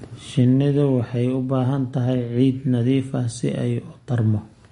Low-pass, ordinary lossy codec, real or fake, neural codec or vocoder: 10.8 kHz; MP3, 48 kbps; real; none